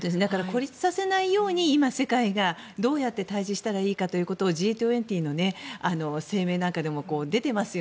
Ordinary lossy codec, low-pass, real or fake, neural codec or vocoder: none; none; real; none